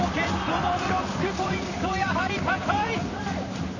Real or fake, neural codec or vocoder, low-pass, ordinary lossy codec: fake; vocoder, 44.1 kHz, 128 mel bands, Pupu-Vocoder; 7.2 kHz; none